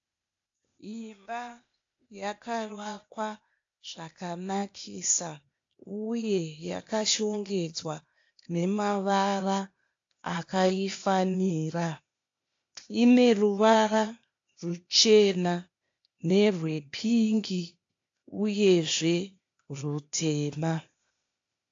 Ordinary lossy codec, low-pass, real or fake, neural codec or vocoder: AAC, 48 kbps; 7.2 kHz; fake; codec, 16 kHz, 0.8 kbps, ZipCodec